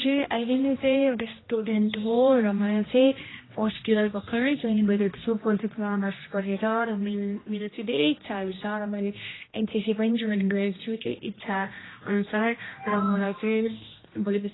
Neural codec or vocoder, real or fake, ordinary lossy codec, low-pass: codec, 16 kHz, 1 kbps, X-Codec, HuBERT features, trained on general audio; fake; AAC, 16 kbps; 7.2 kHz